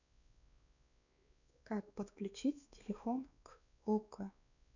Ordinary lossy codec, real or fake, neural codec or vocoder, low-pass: none; fake; codec, 16 kHz, 2 kbps, X-Codec, WavLM features, trained on Multilingual LibriSpeech; 7.2 kHz